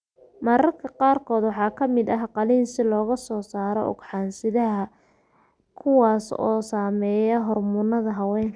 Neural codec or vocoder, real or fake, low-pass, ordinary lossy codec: none; real; 9.9 kHz; none